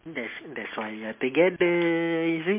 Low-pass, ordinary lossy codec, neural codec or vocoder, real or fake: 3.6 kHz; MP3, 24 kbps; none; real